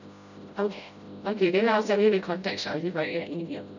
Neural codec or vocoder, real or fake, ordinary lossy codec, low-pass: codec, 16 kHz, 0.5 kbps, FreqCodec, smaller model; fake; none; 7.2 kHz